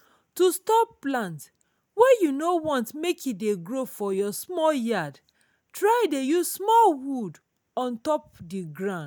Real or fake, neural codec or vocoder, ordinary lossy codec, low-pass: real; none; none; none